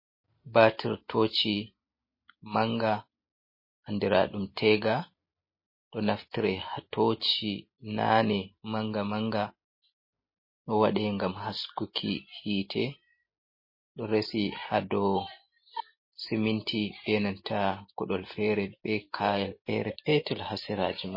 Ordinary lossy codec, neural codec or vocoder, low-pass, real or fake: MP3, 24 kbps; none; 5.4 kHz; real